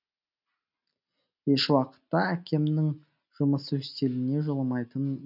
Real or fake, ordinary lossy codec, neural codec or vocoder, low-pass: real; none; none; 5.4 kHz